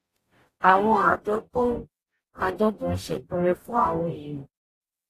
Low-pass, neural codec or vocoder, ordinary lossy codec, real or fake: 14.4 kHz; codec, 44.1 kHz, 0.9 kbps, DAC; AAC, 48 kbps; fake